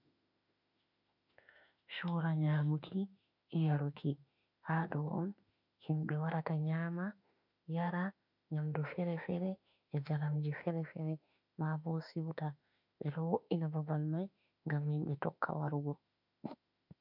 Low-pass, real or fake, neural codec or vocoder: 5.4 kHz; fake; autoencoder, 48 kHz, 32 numbers a frame, DAC-VAE, trained on Japanese speech